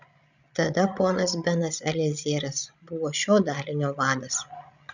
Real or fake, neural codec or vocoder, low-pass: fake; codec, 16 kHz, 16 kbps, FreqCodec, larger model; 7.2 kHz